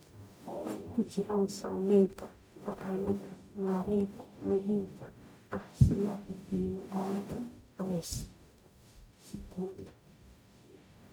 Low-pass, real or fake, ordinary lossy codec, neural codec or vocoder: none; fake; none; codec, 44.1 kHz, 0.9 kbps, DAC